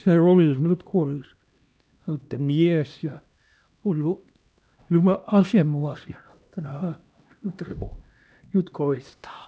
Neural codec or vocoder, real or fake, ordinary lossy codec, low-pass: codec, 16 kHz, 1 kbps, X-Codec, HuBERT features, trained on LibriSpeech; fake; none; none